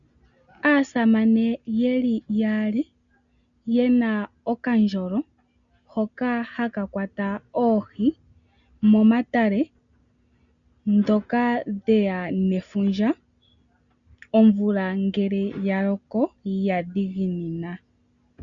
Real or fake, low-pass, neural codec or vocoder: real; 7.2 kHz; none